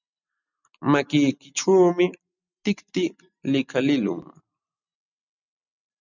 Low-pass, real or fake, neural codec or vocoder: 7.2 kHz; real; none